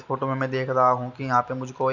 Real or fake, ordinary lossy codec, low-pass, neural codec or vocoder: real; none; 7.2 kHz; none